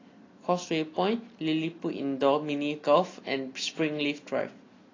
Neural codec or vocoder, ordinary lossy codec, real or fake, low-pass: none; AAC, 32 kbps; real; 7.2 kHz